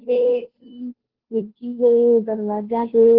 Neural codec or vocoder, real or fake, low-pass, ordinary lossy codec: codec, 16 kHz, 0.5 kbps, FunCodec, trained on Chinese and English, 25 frames a second; fake; 5.4 kHz; Opus, 16 kbps